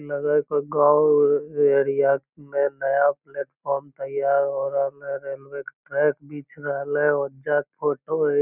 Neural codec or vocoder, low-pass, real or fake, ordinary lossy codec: none; 3.6 kHz; real; none